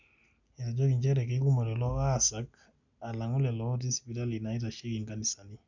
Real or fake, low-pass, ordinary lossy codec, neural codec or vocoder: real; 7.2 kHz; none; none